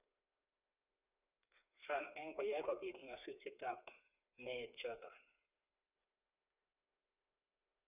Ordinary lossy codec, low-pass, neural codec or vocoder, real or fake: none; 3.6 kHz; codec, 16 kHz, 4 kbps, FreqCodec, smaller model; fake